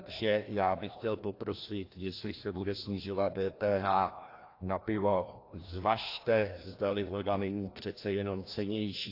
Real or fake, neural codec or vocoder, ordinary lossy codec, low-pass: fake; codec, 16 kHz, 1 kbps, FreqCodec, larger model; AAC, 32 kbps; 5.4 kHz